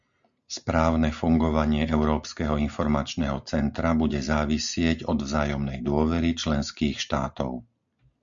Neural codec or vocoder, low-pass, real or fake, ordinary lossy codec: none; 7.2 kHz; real; MP3, 64 kbps